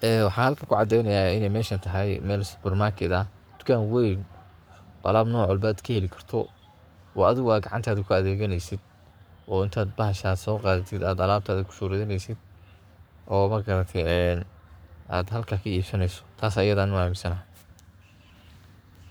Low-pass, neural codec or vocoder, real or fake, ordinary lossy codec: none; codec, 44.1 kHz, 7.8 kbps, Pupu-Codec; fake; none